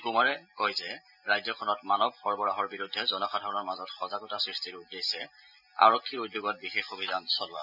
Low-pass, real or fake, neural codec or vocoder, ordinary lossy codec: 5.4 kHz; real; none; none